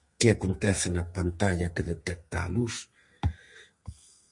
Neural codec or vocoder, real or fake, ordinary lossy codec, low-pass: codec, 32 kHz, 1.9 kbps, SNAC; fake; MP3, 48 kbps; 10.8 kHz